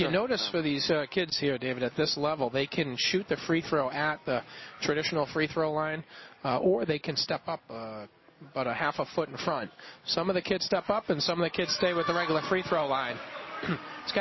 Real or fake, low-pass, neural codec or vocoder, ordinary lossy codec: real; 7.2 kHz; none; MP3, 24 kbps